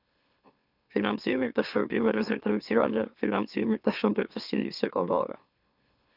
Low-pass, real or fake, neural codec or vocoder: 5.4 kHz; fake; autoencoder, 44.1 kHz, a latent of 192 numbers a frame, MeloTTS